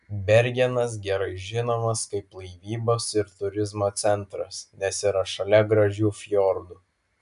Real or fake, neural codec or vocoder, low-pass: real; none; 10.8 kHz